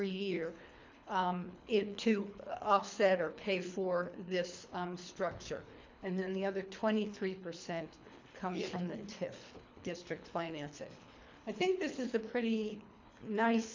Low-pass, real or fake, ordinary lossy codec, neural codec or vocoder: 7.2 kHz; fake; MP3, 64 kbps; codec, 24 kHz, 3 kbps, HILCodec